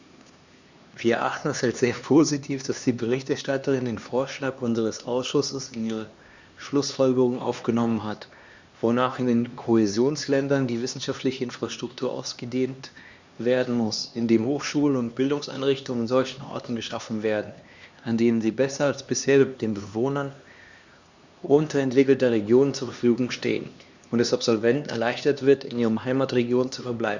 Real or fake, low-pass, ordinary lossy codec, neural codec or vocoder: fake; 7.2 kHz; Opus, 64 kbps; codec, 16 kHz, 2 kbps, X-Codec, HuBERT features, trained on LibriSpeech